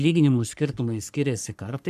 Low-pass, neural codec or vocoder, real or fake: 14.4 kHz; codec, 44.1 kHz, 3.4 kbps, Pupu-Codec; fake